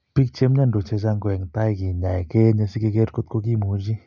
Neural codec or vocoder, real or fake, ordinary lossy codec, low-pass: none; real; none; 7.2 kHz